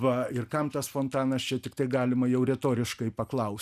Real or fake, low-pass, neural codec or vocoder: real; 14.4 kHz; none